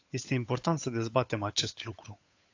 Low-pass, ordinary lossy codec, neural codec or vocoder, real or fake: 7.2 kHz; AAC, 48 kbps; codec, 16 kHz, 8 kbps, FunCodec, trained on Chinese and English, 25 frames a second; fake